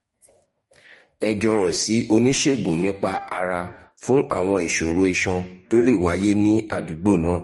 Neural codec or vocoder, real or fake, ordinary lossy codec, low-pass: codec, 44.1 kHz, 2.6 kbps, DAC; fake; MP3, 48 kbps; 19.8 kHz